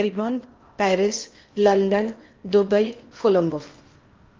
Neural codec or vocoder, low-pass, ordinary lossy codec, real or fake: codec, 16 kHz in and 24 kHz out, 0.8 kbps, FocalCodec, streaming, 65536 codes; 7.2 kHz; Opus, 32 kbps; fake